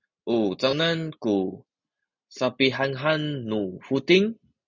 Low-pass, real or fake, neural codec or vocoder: 7.2 kHz; real; none